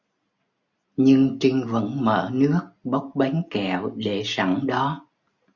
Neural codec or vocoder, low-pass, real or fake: none; 7.2 kHz; real